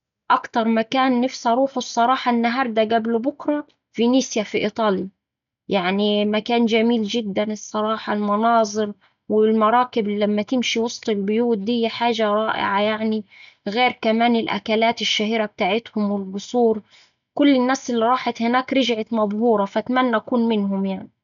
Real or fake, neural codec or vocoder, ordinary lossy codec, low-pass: real; none; none; 7.2 kHz